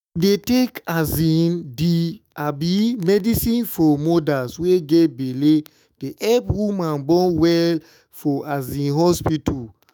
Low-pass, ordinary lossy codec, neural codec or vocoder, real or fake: none; none; autoencoder, 48 kHz, 128 numbers a frame, DAC-VAE, trained on Japanese speech; fake